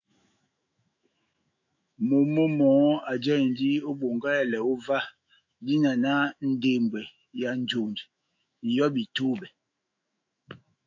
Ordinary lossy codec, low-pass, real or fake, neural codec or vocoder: AAC, 48 kbps; 7.2 kHz; fake; autoencoder, 48 kHz, 128 numbers a frame, DAC-VAE, trained on Japanese speech